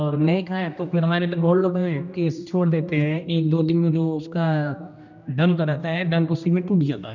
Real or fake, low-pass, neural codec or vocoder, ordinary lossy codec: fake; 7.2 kHz; codec, 16 kHz, 1 kbps, X-Codec, HuBERT features, trained on general audio; none